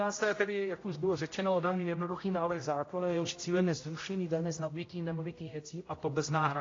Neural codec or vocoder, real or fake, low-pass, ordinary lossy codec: codec, 16 kHz, 0.5 kbps, X-Codec, HuBERT features, trained on general audio; fake; 7.2 kHz; AAC, 32 kbps